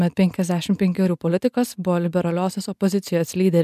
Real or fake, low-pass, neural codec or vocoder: real; 14.4 kHz; none